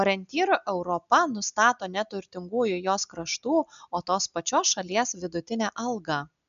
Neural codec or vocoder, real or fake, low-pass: none; real; 7.2 kHz